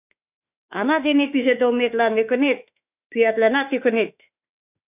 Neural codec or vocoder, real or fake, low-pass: autoencoder, 48 kHz, 32 numbers a frame, DAC-VAE, trained on Japanese speech; fake; 3.6 kHz